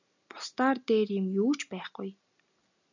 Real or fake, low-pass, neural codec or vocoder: real; 7.2 kHz; none